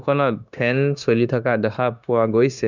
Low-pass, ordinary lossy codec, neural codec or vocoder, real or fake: 7.2 kHz; none; codec, 16 kHz, 0.9 kbps, LongCat-Audio-Codec; fake